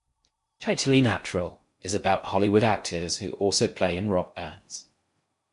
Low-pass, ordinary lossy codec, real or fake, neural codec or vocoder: 10.8 kHz; MP3, 64 kbps; fake; codec, 16 kHz in and 24 kHz out, 0.6 kbps, FocalCodec, streaming, 4096 codes